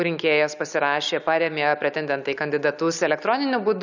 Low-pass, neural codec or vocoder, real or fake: 7.2 kHz; none; real